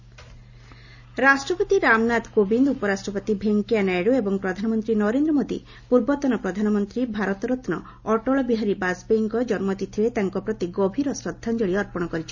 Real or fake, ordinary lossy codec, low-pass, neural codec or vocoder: real; none; 7.2 kHz; none